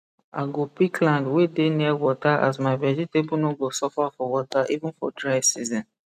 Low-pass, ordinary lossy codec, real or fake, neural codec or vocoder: 14.4 kHz; none; real; none